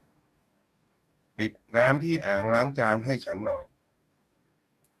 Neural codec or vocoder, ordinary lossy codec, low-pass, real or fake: codec, 44.1 kHz, 2.6 kbps, DAC; Opus, 64 kbps; 14.4 kHz; fake